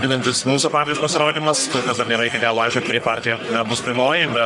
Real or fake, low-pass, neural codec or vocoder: fake; 10.8 kHz; codec, 44.1 kHz, 1.7 kbps, Pupu-Codec